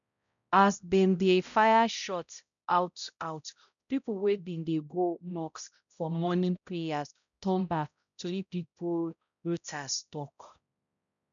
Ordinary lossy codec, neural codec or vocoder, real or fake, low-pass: none; codec, 16 kHz, 0.5 kbps, X-Codec, HuBERT features, trained on balanced general audio; fake; 7.2 kHz